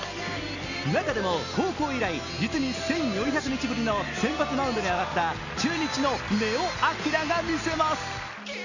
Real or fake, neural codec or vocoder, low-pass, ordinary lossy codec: real; none; 7.2 kHz; none